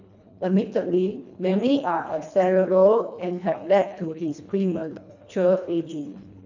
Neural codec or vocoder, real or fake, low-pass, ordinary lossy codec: codec, 24 kHz, 1.5 kbps, HILCodec; fake; 7.2 kHz; none